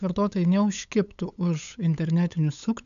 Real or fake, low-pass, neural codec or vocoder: fake; 7.2 kHz; codec, 16 kHz, 8 kbps, FunCodec, trained on LibriTTS, 25 frames a second